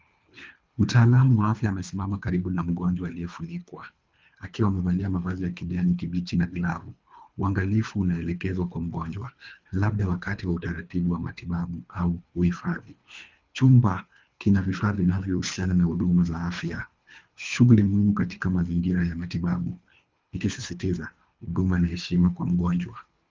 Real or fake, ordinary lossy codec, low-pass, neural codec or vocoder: fake; Opus, 32 kbps; 7.2 kHz; codec, 24 kHz, 3 kbps, HILCodec